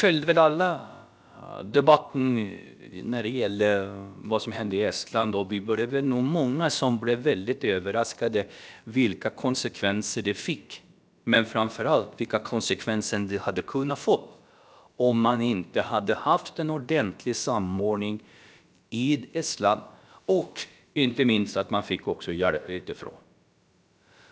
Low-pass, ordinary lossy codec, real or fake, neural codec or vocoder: none; none; fake; codec, 16 kHz, about 1 kbps, DyCAST, with the encoder's durations